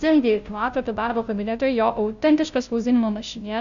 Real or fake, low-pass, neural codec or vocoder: fake; 7.2 kHz; codec, 16 kHz, 0.5 kbps, FunCodec, trained on Chinese and English, 25 frames a second